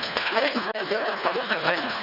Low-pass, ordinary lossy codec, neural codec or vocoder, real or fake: 5.4 kHz; none; codec, 24 kHz, 1.5 kbps, HILCodec; fake